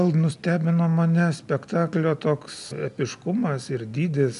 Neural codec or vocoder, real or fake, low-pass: none; real; 10.8 kHz